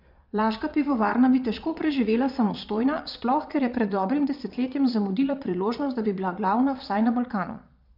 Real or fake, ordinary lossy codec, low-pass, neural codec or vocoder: fake; none; 5.4 kHz; vocoder, 22.05 kHz, 80 mel bands, Vocos